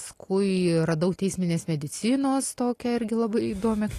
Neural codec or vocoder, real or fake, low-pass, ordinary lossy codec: none; real; 14.4 kHz; AAC, 48 kbps